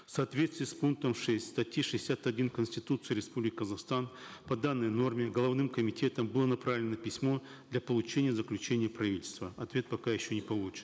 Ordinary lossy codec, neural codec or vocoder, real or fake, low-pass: none; none; real; none